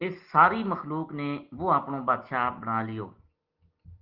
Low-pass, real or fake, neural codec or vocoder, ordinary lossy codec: 5.4 kHz; real; none; Opus, 16 kbps